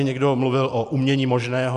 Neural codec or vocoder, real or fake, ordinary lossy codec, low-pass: none; real; MP3, 96 kbps; 10.8 kHz